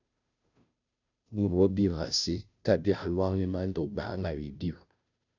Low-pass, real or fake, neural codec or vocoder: 7.2 kHz; fake; codec, 16 kHz, 0.5 kbps, FunCodec, trained on Chinese and English, 25 frames a second